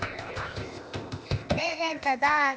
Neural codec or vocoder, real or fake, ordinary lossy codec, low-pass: codec, 16 kHz, 0.8 kbps, ZipCodec; fake; none; none